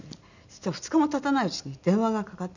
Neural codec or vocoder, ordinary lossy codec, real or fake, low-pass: none; none; real; 7.2 kHz